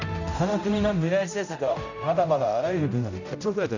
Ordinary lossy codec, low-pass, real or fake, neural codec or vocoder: none; 7.2 kHz; fake; codec, 16 kHz, 0.5 kbps, X-Codec, HuBERT features, trained on general audio